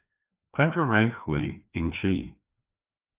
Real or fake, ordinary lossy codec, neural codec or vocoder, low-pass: fake; Opus, 24 kbps; codec, 16 kHz, 2 kbps, FreqCodec, larger model; 3.6 kHz